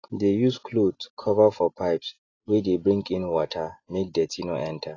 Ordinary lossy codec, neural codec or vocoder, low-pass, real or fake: AAC, 32 kbps; none; 7.2 kHz; real